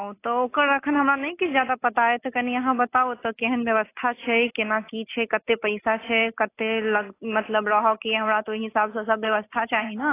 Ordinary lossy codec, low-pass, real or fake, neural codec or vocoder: AAC, 24 kbps; 3.6 kHz; real; none